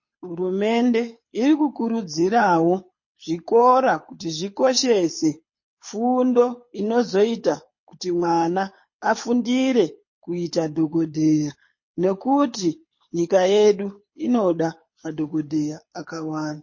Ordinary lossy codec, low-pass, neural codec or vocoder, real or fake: MP3, 32 kbps; 7.2 kHz; codec, 24 kHz, 6 kbps, HILCodec; fake